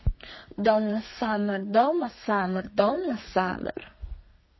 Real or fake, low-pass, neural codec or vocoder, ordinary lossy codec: fake; 7.2 kHz; codec, 32 kHz, 1.9 kbps, SNAC; MP3, 24 kbps